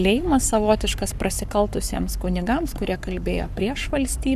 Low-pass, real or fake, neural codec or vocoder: 14.4 kHz; fake; codec, 44.1 kHz, 7.8 kbps, Pupu-Codec